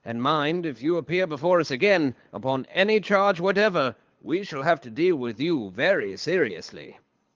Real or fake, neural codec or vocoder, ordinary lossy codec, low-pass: fake; codec, 24 kHz, 6 kbps, HILCodec; Opus, 32 kbps; 7.2 kHz